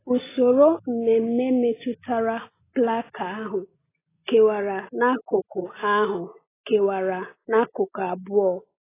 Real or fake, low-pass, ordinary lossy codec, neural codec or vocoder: real; 3.6 kHz; AAC, 16 kbps; none